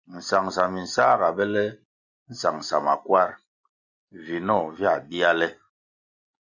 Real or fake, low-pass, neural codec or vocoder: real; 7.2 kHz; none